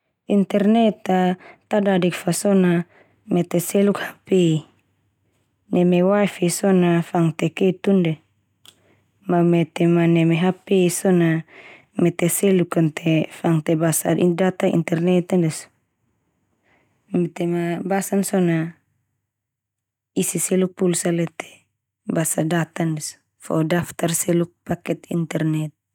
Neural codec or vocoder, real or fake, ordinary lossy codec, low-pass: none; real; none; 19.8 kHz